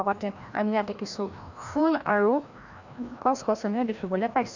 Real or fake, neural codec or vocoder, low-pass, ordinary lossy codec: fake; codec, 16 kHz, 1 kbps, FreqCodec, larger model; 7.2 kHz; none